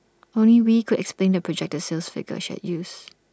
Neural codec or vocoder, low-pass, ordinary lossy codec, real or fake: none; none; none; real